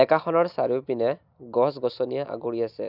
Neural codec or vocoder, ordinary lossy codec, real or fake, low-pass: none; none; real; 5.4 kHz